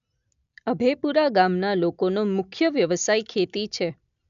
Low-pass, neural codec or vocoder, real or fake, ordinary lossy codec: 7.2 kHz; none; real; none